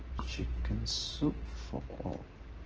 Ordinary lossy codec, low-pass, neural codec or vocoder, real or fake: Opus, 16 kbps; 7.2 kHz; none; real